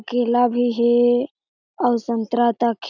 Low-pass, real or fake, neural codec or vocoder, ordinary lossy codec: 7.2 kHz; real; none; none